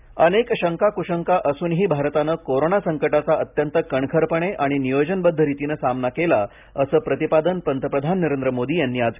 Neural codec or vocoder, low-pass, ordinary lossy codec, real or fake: none; 3.6 kHz; none; real